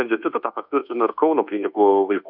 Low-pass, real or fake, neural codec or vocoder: 5.4 kHz; fake; codec, 24 kHz, 1.2 kbps, DualCodec